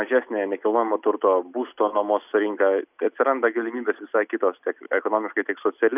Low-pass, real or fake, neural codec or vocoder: 3.6 kHz; real; none